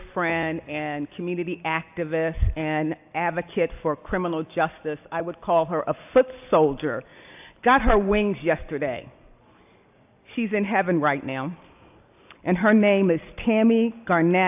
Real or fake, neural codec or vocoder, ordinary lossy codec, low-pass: real; none; AAC, 32 kbps; 3.6 kHz